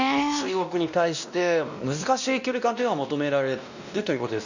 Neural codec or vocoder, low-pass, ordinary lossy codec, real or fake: codec, 16 kHz, 1 kbps, X-Codec, WavLM features, trained on Multilingual LibriSpeech; 7.2 kHz; none; fake